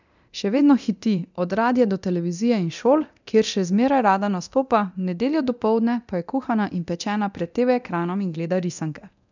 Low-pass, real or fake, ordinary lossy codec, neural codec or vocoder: 7.2 kHz; fake; none; codec, 24 kHz, 0.9 kbps, DualCodec